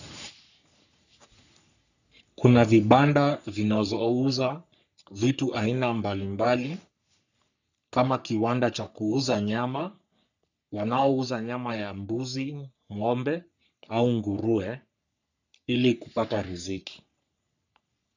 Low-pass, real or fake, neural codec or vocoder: 7.2 kHz; fake; codec, 44.1 kHz, 3.4 kbps, Pupu-Codec